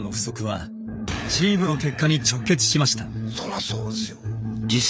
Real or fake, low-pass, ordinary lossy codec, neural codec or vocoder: fake; none; none; codec, 16 kHz, 4 kbps, FreqCodec, larger model